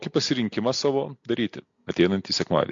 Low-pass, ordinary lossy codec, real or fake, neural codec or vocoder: 7.2 kHz; MP3, 48 kbps; real; none